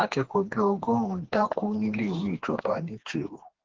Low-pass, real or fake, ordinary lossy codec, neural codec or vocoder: 7.2 kHz; fake; Opus, 16 kbps; codec, 16 kHz, 2 kbps, FreqCodec, smaller model